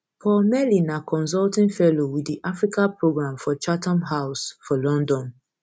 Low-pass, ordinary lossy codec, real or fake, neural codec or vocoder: none; none; real; none